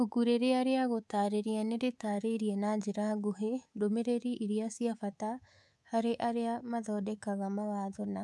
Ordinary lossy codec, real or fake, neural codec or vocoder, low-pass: none; fake; codec, 24 kHz, 3.1 kbps, DualCodec; none